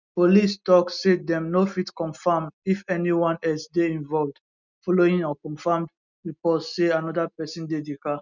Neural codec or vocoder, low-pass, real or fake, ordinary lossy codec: none; 7.2 kHz; real; none